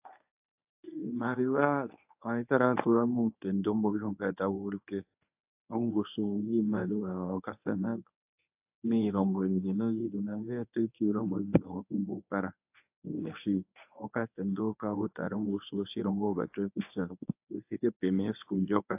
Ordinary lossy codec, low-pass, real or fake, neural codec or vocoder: AAC, 32 kbps; 3.6 kHz; fake; codec, 24 kHz, 0.9 kbps, WavTokenizer, medium speech release version 1